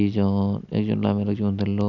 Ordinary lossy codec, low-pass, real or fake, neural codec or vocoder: none; 7.2 kHz; real; none